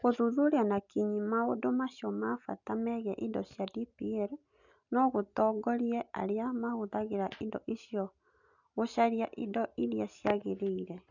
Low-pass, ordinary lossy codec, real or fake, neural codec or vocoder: 7.2 kHz; none; real; none